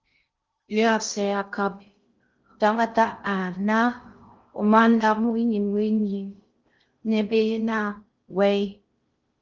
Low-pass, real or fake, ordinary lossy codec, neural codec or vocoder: 7.2 kHz; fake; Opus, 32 kbps; codec, 16 kHz in and 24 kHz out, 0.6 kbps, FocalCodec, streaming, 2048 codes